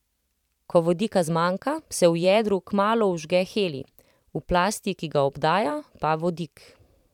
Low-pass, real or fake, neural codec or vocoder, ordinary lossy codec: 19.8 kHz; fake; vocoder, 44.1 kHz, 128 mel bands every 512 samples, BigVGAN v2; none